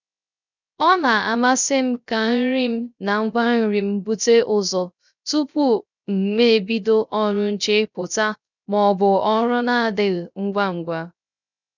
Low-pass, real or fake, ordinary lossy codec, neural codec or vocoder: 7.2 kHz; fake; none; codec, 16 kHz, 0.3 kbps, FocalCodec